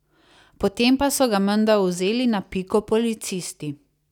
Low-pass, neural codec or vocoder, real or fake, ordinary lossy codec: 19.8 kHz; none; real; none